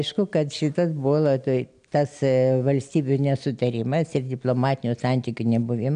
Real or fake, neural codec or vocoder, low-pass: real; none; 9.9 kHz